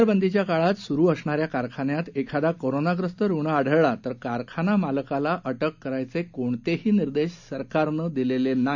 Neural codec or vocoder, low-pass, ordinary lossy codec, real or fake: none; 7.2 kHz; none; real